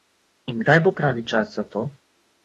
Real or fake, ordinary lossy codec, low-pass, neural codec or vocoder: fake; AAC, 32 kbps; 19.8 kHz; autoencoder, 48 kHz, 32 numbers a frame, DAC-VAE, trained on Japanese speech